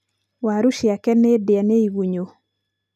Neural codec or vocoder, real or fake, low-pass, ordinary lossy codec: none; real; 14.4 kHz; none